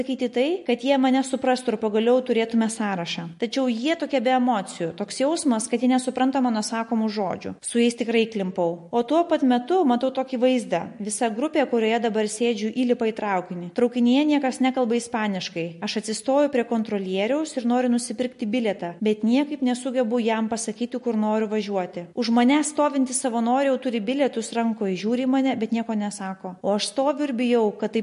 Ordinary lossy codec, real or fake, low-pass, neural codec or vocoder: MP3, 48 kbps; real; 14.4 kHz; none